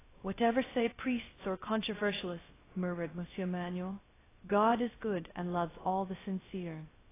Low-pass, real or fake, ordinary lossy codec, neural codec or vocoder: 3.6 kHz; fake; AAC, 16 kbps; codec, 16 kHz, 0.3 kbps, FocalCodec